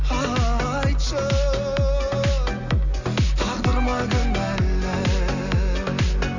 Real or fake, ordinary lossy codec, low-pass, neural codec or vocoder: real; none; 7.2 kHz; none